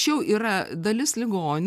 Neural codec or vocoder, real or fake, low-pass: none; real; 14.4 kHz